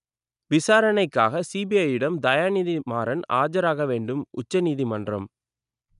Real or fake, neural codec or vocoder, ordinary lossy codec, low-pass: real; none; none; 10.8 kHz